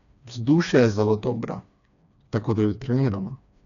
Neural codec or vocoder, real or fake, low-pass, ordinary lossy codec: codec, 16 kHz, 2 kbps, FreqCodec, smaller model; fake; 7.2 kHz; none